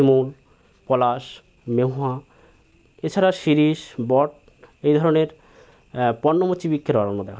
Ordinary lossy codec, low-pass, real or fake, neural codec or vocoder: none; none; real; none